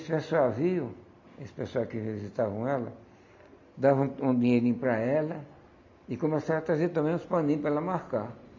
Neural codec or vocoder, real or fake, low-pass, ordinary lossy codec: none; real; 7.2 kHz; MP3, 64 kbps